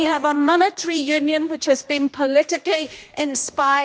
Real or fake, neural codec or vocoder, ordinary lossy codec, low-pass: fake; codec, 16 kHz, 1 kbps, X-Codec, HuBERT features, trained on general audio; none; none